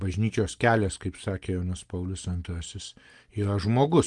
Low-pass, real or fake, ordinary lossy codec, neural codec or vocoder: 10.8 kHz; real; Opus, 24 kbps; none